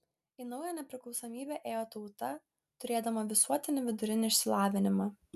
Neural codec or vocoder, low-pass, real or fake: none; 14.4 kHz; real